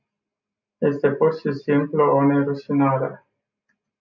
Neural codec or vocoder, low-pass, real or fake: none; 7.2 kHz; real